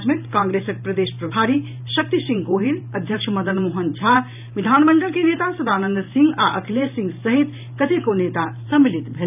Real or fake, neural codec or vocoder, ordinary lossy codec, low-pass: fake; vocoder, 44.1 kHz, 128 mel bands every 512 samples, BigVGAN v2; none; 3.6 kHz